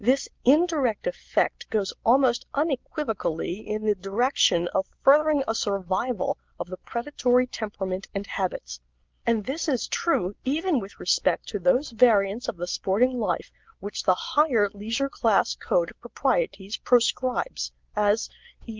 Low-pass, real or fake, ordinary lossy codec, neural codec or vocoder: 7.2 kHz; real; Opus, 32 kbps; none